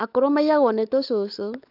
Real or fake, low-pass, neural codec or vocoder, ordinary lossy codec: fake; 5.4 kHz; codec, 16 kHz, 16 kbps, FunCodec, trained on LibriTTS, 50 frames a second; none